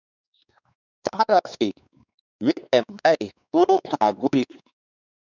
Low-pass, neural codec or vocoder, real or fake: 7.2 kHz; codec, 16 kHz, 2 kbps, X-Codec, WavLM features, trained on Multilingual LibriSpeech; fake